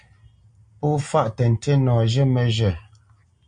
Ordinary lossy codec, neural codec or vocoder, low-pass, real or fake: MP3, 64 kbps; none; 9.9 kHz; real